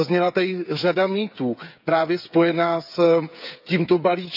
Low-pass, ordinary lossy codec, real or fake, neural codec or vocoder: 5.4 kHz; none; fake; codec, 16 kHz, 8 kbps, FreqCodec, smaller model